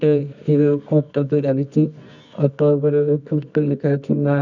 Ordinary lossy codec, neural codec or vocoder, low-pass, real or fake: none; codec, 24 kHz, 0.9 kbps, WavTokenizer, medium music audio release; 7.2 kHz; fake